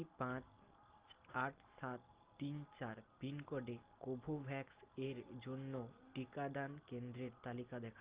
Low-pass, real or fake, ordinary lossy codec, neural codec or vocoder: 3.6 kHz; real; Opus, 32 kbps; none